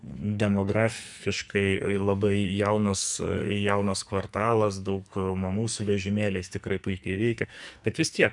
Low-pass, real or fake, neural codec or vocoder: 10.8 kHz; fake; codec, 44.1 kHz, 2.6 kbps, SNAC